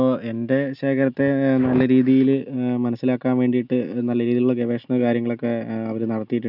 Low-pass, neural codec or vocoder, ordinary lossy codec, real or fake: 5.4 kHz; none; none; real